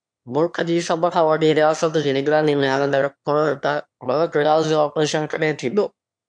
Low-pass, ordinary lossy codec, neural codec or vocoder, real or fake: 9.9 kHz; MP3, 64 kbps; autoencoder, 22.05 kHz, a latent of 192 numbers a frame, VITS, trained on one speaker; fake